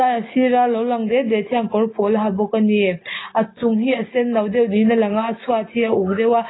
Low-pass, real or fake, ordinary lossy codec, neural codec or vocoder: 7.2 kHz; real; AAC, 16 kbps; none